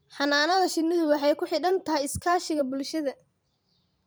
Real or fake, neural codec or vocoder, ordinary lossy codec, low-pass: fake; vocoder, 44.1 kHz, 128 mel bands every 512 samples, BigVGAN v2; none; none